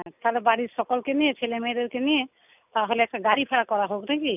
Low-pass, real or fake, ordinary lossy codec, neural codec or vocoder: 3.6 kHz; real; none; none